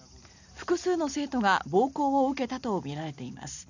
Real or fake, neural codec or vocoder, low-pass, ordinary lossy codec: real; none; 7.2 kHz; none